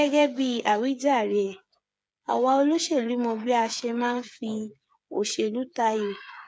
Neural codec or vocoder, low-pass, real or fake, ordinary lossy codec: codec, 16 kHz, 8 kbps, FreqCodec, smaller model; none; fake; none